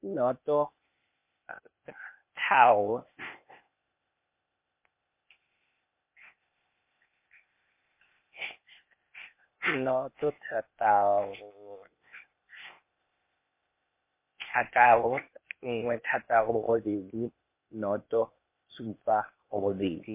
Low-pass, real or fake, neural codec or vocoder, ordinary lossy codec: 3.6 kHz; fake; codec, 16 kHz, 0.8 kbps, ZipCodec; MP3, 24 kbps